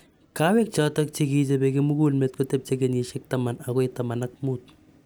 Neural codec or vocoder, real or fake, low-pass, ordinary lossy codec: none; real; none; none